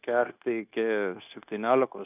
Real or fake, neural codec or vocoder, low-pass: fake; codec, 16 kHz, 0.9 kbps, LongCat-Audio-Codec; 3.6 kHz